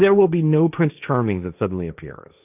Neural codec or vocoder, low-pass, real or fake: codec, 16 kHz, 1.1 kbps, Voila-Tokenizer; 3.6 kHz; fake